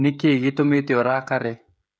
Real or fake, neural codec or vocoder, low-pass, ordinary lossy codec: fake; codec, 16 kHz, 16 kbps, FreqCodec, smaller model; none; none